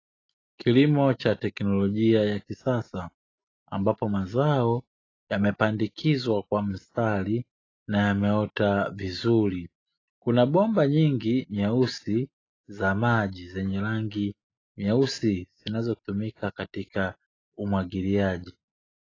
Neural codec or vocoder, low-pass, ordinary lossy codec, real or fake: none; 7.2 kHz; AAC, 32 kbps; real